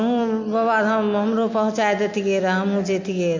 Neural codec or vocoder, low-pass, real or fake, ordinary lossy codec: none; 7.2 kHz; real; MP3, 48 kbps